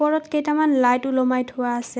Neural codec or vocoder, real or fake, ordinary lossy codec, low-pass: none; real; none; none